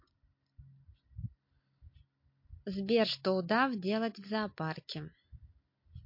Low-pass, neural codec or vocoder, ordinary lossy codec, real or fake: 5.4 kHz; none; MP3, 32 kbps; real